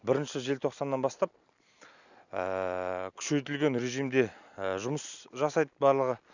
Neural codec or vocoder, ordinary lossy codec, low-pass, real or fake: none; none; 7.2 kHz; real